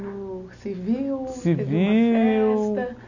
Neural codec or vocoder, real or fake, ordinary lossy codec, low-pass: none; real; none; 7.2 kHz